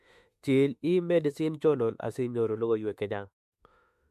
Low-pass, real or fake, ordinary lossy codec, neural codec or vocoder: 14.4 kHz; fake; MP3, 64 kbps; autoencoder, 48 kHz, 32 numbers a frame, DAC-VAE, trained on Japanese speech